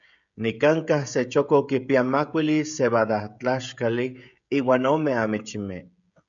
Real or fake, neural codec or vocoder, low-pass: fake; codec, 16 kHz, 16 kbps, FreqCodec, smaller model; 7.2 kHz